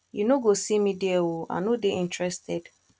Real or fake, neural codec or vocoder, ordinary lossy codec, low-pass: real; none; none; none